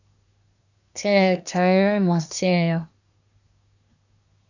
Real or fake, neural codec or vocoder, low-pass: fake; codec, 24 kHz, 1 kbps, SNAC; 7.2 kHz